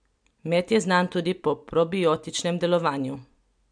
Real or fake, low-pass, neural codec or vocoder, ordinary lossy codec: real; 9.9 kHz; none; MP3, 96 kbps